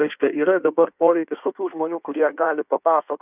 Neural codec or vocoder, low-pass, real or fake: codec, 16 kHz in and 24 kHz out, 1.1 kbps, FireRedTTS-2 codec; 3.6 kHz; fake